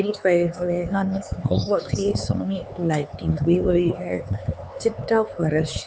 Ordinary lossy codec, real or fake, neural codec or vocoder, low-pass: none; fake; codec, 16 kHz, 4 kbps, X-Codec, HuBERT features, trained on LibriSpeech; none